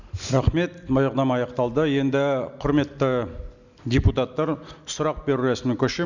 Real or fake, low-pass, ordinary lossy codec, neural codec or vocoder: real; 7.2 kHz; none; none